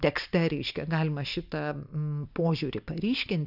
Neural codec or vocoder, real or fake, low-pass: none; real; 5.4 kHz